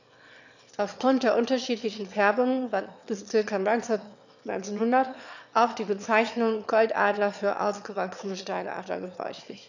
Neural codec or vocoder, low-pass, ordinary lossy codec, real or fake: autoencoder, 22.05 kHz, a latent of 192 numbers a frame, VITS, trained on one speaker; 7.2 kHz; none; fake